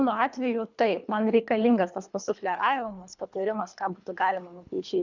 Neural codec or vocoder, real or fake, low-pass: codec, 24 kHz, 3 kbps, HILCodec; fake; 7.2 kHz